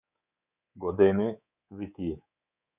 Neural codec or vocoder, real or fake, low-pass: codec, 24 kHz, 3.1 kbps, DualCodec; fake; 3.6 kHz